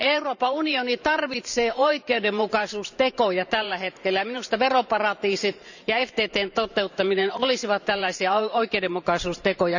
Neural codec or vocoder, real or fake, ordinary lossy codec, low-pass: vocoder, 44.1 kHz, 128 mel bands every 512 samples, BigVGAN v2; fake; none; 7.2 kHz